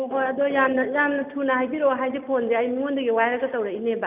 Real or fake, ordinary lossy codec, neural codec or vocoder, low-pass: real; none; none; 3.6 kHz